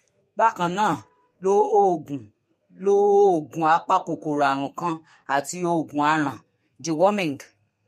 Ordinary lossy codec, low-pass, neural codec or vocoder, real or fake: MP3, 64 kbps; 14.4 kHz; codec, 32 kHz, 1.9 kbps, SNAC; fake